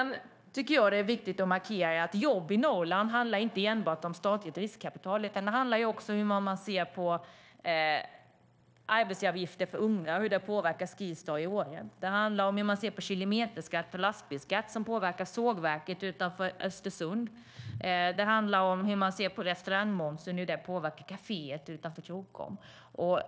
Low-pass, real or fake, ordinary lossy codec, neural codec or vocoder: none; fake; none; codec, 16 kHz, 0.9 kbps, LongCat-Audio-Codec